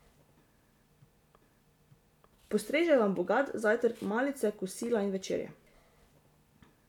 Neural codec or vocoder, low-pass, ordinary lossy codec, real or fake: none; 19.8 kHz; none; real